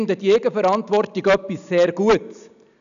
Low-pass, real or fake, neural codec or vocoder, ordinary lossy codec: 7.2 kHz; real; none; none